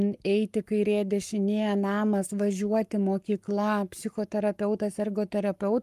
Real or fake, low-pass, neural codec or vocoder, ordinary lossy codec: fake; 14.4 kHz; codec, 44.1 kHz, 7.8 kbps, Pupu-Codec; Opus, 32 kbps